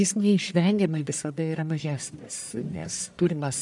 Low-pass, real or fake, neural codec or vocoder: 10.8 kHz; fake; codec, 44.1 kHz, 1.7 kbps, Pupu-Codec